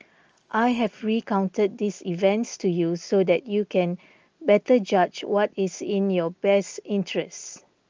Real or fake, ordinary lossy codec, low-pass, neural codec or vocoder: real; Opus, 24 kbps; 7.2 kHz; none